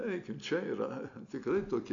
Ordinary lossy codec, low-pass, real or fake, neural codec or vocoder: AAC, 64 kbps; 7.2 kHz; real; none